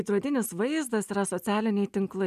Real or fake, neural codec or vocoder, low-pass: real; none; 14.4 kHz